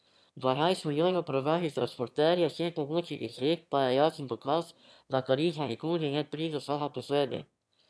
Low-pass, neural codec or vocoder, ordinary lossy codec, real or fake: none; autoencoder, 22.05 kHz, a latent of 192 numbers a frame, VITS, trained on one speaker; none; fake